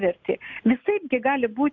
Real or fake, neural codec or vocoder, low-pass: real; none; 7.2 kHz